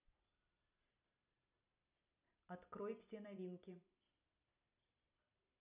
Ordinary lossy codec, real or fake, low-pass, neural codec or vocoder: none; fake; 3.6 kHz; vocoder, 44.1 kHz, 128 mel bands every 256 samples, BigVGAN v2